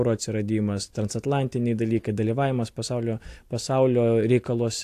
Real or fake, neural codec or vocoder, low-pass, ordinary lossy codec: real; none; 14.4 kHz; AAC, 64 kbps